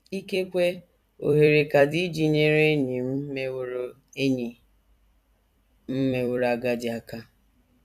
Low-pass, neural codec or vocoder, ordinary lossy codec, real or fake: 14.4 kHz; vocoder, 44.1 kHz, 128 mel bands every 256 samples, BigVGAN v2; none; fake